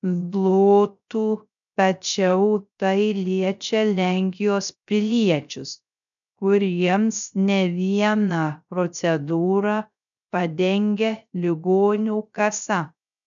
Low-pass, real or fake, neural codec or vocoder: 7.2 kHz; fake; codec, 16 kHz, 0.3 kbps, FocalCodec